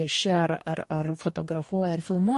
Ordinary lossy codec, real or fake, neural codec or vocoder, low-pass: MP3, 48 kbps; fake; codec, 44.1 kHz, 2.6 kbps, DAC; 14.4 kHz